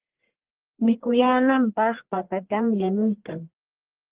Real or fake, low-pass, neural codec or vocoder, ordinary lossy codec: fake; 3.6 kHz; codec, 44.1 kHz, 1.7 kbps, Pupu-Codec; Opus, 16 kbps